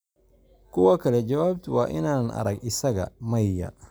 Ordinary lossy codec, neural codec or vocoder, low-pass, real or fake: none; none; none; real